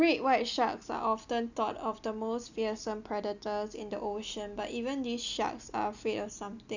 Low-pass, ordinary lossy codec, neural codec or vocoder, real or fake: 7.2 kHz; none; none; real